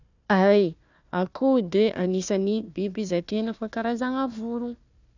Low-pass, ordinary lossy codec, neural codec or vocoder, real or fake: 7.2 kHz; none; codec, 16 kHz, 1 kbps, FunCodec, trained on Chinese and English, 50 frames a second; fake